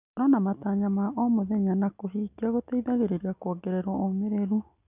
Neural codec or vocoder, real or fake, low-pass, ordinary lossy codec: none; real; 3.6 kHz; none